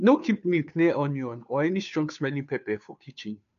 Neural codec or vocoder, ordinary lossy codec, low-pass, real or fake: codec, 16 kHz, 2 kbps, FunCodec, trained on Chinese and English, 25 frames a second; none; 7.2 kHz; fake